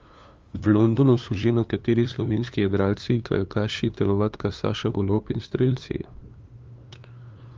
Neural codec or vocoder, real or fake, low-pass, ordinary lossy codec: codec, 16 kHz, 2 kbps, FunCodec, trained on LibriTTS, 25 frames a second; fake; 7.2 kHz; Opus, 32 kbps